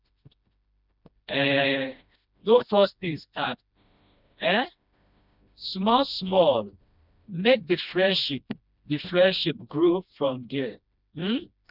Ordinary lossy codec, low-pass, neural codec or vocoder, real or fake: none; 5.4 kHz; codec, 16 kHz, 1 kbps, FreqCodec, smaller model; fake